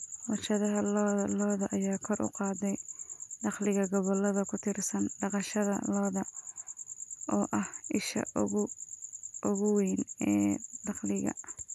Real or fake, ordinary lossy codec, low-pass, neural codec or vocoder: real; none; 14.4 kHz; none